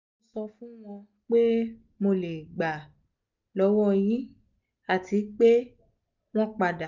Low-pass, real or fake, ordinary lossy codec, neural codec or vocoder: 7.2 kHz; real; none; none